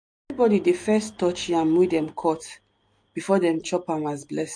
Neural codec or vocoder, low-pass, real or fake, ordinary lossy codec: none; 9.9 kHz; real; MP3, 48 kbps